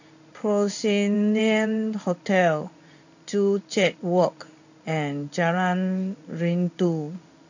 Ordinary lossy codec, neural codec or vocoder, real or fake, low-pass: none; codec, 16 kHz in and 24 kHz out, 1 kbps, XY-Tokenizer; fake; 7.2 kHz